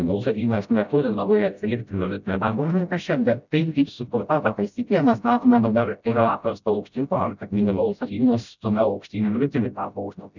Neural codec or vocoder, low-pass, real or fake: codec, 16 kHz, 0.5 kbps, FreqCodec, smaller model; 7.2 kHz; fake